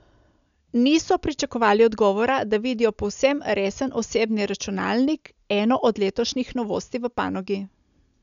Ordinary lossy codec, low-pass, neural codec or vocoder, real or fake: none; 7.2 kHz; none; real